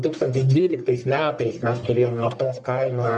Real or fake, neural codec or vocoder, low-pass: fake; codec, 44.1 kHz, 1.7 kbps, Pupu-Codec; 10.8 kHz